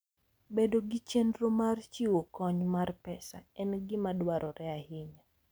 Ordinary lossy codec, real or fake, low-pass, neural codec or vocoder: none; real; none; none